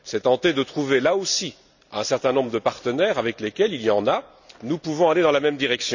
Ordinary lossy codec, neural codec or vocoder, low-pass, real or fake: none; none; 7.2 kHz; real